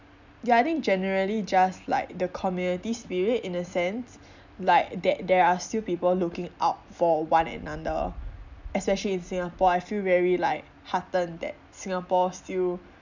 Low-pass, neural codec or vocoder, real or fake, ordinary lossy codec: 7.2 kHz; none; real; none